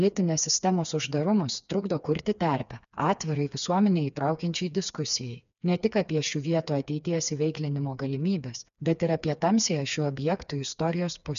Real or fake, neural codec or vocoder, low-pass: fake; codec, 16 kHz, 4 kbps, FreqCodec, smaller model; 7.2 kHz